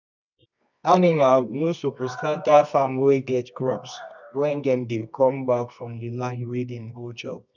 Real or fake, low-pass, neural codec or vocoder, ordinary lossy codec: fake; 7.2 kHz; codec, 24 kHz, 0.9 kbps, WavTokenizer, medium music audio release; none